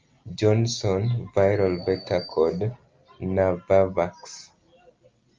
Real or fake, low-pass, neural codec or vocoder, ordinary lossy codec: real; 7.2 kHz; none; Opus, 24 kbps